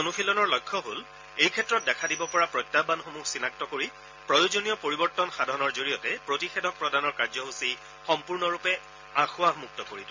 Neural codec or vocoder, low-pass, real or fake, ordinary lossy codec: none; 7.2 kHz; real; MP3, 64 kbps